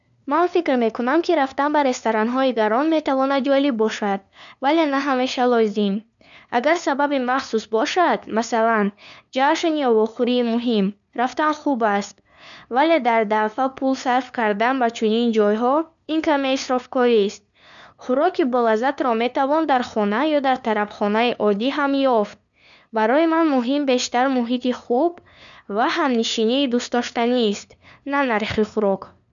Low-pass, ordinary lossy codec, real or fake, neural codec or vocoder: 7.2 kHz; none; fake; codec, 16 kHz, 2 kbps, FunCodec, trained on LibriTTS, 25 frames a second